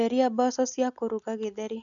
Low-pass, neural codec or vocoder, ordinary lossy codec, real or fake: 7.2 kHz; none; none; real